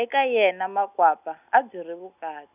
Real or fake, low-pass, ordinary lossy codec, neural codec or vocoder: real; 3.6 kHz; none; none